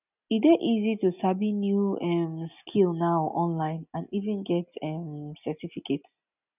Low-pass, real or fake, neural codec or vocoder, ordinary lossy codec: 3.6 kHz; real; none; none